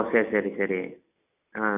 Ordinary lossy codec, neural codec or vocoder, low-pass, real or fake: none; none; 3.6 kHz; real